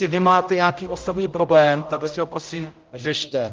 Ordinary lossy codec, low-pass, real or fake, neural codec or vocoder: Opus, 24 kbps; 7.2 kHz; fake; codec, 16 kHz, 0.5 kbps, X-Codec, HuBERT features, trained on general audio